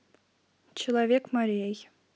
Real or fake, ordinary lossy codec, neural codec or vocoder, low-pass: real; none; none; none